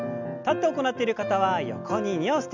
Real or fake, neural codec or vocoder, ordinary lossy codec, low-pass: real; none; none; 7.2 kHz